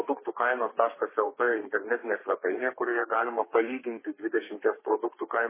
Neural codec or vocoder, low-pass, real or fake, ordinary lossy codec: codec, 32 kHz, 1.9 kbps, SNAC; 3.6 kHz; fake; MP3, 16 kbps